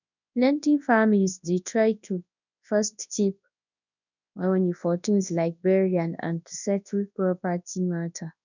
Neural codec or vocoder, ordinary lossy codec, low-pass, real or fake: codec, 24 kHz, 0.9 kbps, WavTokenizer, large speech release; none; 7.2 kHz; fake